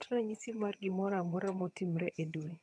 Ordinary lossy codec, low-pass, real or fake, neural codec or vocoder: none; none; fake; vocoder, 22.05 kHz, 80 mel bands, HiFi-GAN